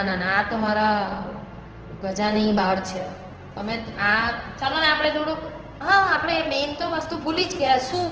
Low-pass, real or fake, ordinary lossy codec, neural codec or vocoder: 7.2 kHz; fake; Opus, 16 kbps; codec, 16 kHz in and 24 kHz out, 1 kbps, XY-Tokenizer